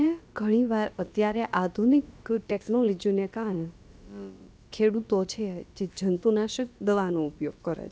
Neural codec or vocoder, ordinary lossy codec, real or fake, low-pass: codec, 16 kHz, about 1 kbps, DyCAST, with the encoder's durations; none; fake; none